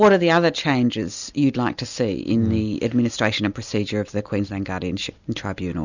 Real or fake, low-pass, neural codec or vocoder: real; 7.2 kHz; none